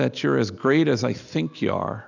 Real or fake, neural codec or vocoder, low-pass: real; none; 7.2 kHz